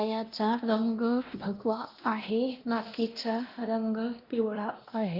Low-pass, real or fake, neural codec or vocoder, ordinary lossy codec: 5.4 kHz; fake; codec, 16 kHz, 1 kbps, X-Codec, WavLM features, trained on Multilingual LibriSpeech; Opus, 24 kbps